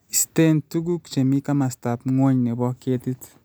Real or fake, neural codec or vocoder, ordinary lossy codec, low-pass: real; none; none; none